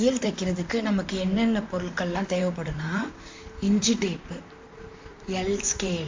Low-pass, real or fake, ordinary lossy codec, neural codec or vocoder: 7.2 kHz; fake; MP3, 48 kbps; vocoder, 44.1 kHz, 128 mel bands, Pupu-Vocoder